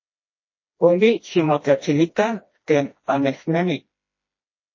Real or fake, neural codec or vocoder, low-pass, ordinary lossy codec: fake; codec, 16 kHz, 1 kbps, FreqCodec, smaller model; 7.2 kHz; MP3, 32 kbps